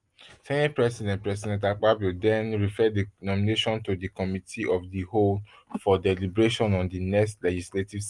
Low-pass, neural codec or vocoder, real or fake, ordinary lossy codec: 10.8 kHz; none; real; Opus, 32 kbps